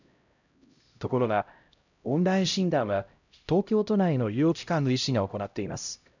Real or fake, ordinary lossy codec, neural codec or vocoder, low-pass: fake; none; codec, 16 kHz, 0.5 kbps, X-Codec, HuBERT features, trained on LibriSpeech; 7.2 kHz